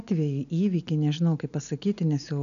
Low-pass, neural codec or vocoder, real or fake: 7.2 kHz; none; real